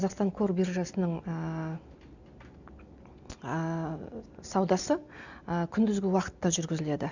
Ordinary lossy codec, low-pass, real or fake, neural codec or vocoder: none; 7.2 kHz; real; none